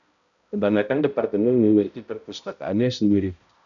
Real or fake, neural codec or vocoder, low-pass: fake; codec, 16 kHz, 0.5 kbps, X-Codec, HuBERT features, trained on balanced general audio; 7.2 kHz